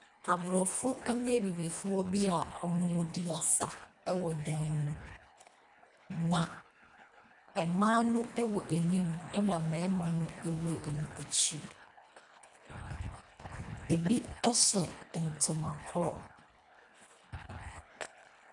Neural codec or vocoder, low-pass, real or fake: codec, 24 kHz, 1.5 kbps, HILCodec; 10.8 kHz; fake